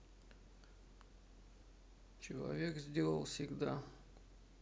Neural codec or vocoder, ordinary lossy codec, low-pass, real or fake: none; none; none; real